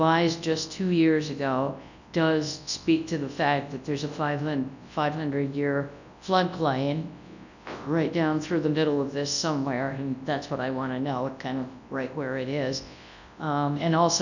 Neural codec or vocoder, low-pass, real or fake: codec, 24 kHz, 0.9 kbps, WavTokenizer, large speech release; 7.2 kHz; fake